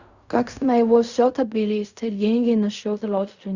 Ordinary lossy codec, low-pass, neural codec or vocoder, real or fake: Opus, 64 kbps; 7.2 kHz; codec, 16 kHz in and 24 kHz out, 0.4 kbps, LongCat-Audio-Codec, fine tuned four codebook decoder; fake